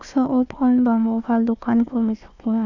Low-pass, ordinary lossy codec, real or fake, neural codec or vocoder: 7.2 kHz; none; fake; codec, 16 kHz, 1 kbps, FunCodec, trained on Chinese and English, 50 frames a second